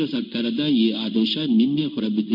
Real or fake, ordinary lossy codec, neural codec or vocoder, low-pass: fake; none; codec, 16 kHz in and 24 kHz out, 1 kbps, XY-Tokenizer; 5.4 kHz